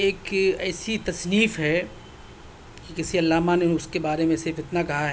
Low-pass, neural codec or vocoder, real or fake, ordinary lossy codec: none; none; real; none